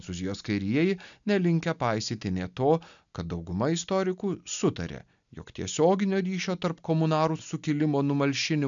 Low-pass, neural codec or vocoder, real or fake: 7.2 kHz; none; real